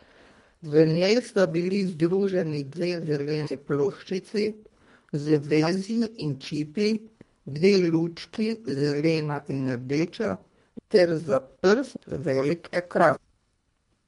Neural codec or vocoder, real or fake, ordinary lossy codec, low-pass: codec, 24 kHz, 1.5 kbps, HILCodec; fake; MP3, 64 kbps; 10.8 kHz